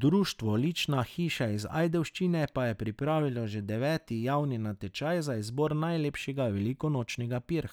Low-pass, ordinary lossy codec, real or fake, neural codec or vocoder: 19.8 kHz; none; real; none